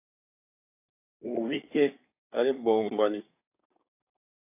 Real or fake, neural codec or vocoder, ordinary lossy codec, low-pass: fake; codec, 24 kHz, 1 kbps, SNAC; AAC, 32 kbps; 3.6 kHz